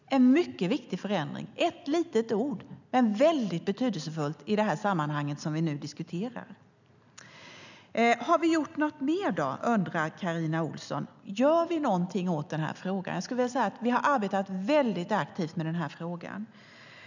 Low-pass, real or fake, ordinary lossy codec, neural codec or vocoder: 7.2 kHz; real; none; none